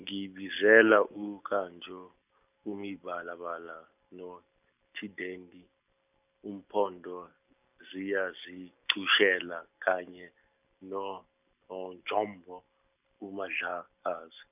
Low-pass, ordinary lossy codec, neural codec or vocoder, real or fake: 3.6 kHz; none; none; real